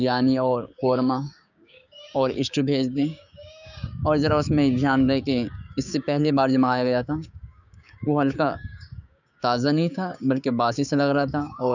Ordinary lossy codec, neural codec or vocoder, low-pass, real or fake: none; codec, 16 kHz, 6 kbps, DAC; 7.2 kHz; fake